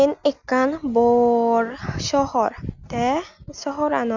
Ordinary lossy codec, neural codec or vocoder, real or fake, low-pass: none; none; real; 7.2 kHz